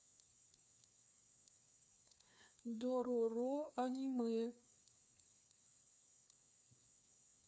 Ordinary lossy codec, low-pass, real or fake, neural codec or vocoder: none; none; fake; codec, 16 kHz, 2 kbps, FreqCodec, larger model